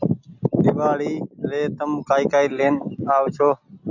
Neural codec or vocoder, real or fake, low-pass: none; real; 7.2 kHz